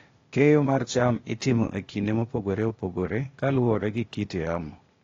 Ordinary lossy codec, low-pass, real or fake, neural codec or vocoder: AAC, 32 kbps; 7.2 kHz; fake; codec, 16 kHz, 0.8 kbps, ZipCodec